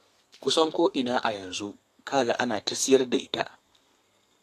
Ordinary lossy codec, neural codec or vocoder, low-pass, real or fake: AAC, 64 kbps; codec, 32 kHz, 1.9 kbps, SNAC; 14.4 kHz; fake